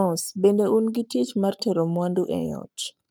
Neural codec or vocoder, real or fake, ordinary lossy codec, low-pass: codec, 44.1 kHz, 7.8 kbps, Pupu-Codec; fake; none; none